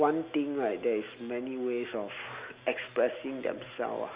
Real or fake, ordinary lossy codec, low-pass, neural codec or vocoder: real; Opus, 64 kbps; 3.6 kHz; none